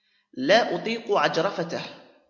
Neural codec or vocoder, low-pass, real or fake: none; 7.2 kHz; real